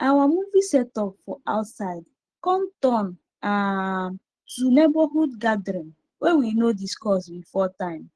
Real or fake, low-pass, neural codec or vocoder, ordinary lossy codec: real; 9.9 kHz; none; Opus, 16 kbps